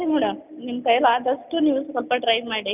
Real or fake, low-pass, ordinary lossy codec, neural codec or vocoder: real; 3.6 kHz; none; none